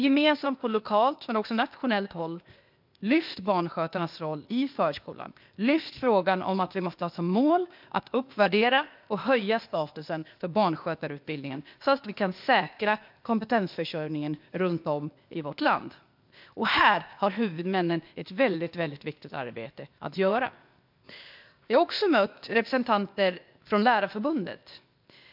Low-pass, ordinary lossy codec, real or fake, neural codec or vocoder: 5.4 kHz; MP3, 48 kbps; fake; codec, 16 kHz, 0.8 kbps, ZipCodec